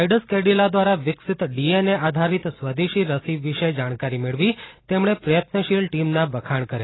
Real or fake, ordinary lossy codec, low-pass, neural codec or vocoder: real; AAC, 16 kbps; 7.2 kHz; none